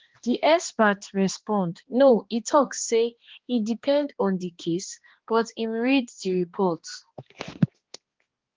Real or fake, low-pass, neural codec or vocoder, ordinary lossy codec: fake; 7.2 kHz; codec, 16 kHz, 2 kbps, X-Codec, HuBERT features, trained on balanced general audio; Opus, 16 kbps